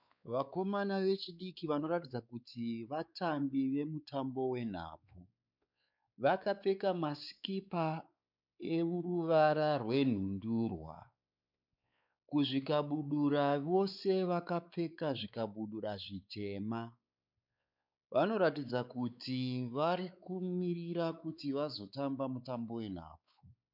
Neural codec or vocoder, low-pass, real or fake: codec, 16 kHz, 4 kbps, X-Codec, WavLM features, trained on Multilingual LibriSpeech; 5.4 kHz; fake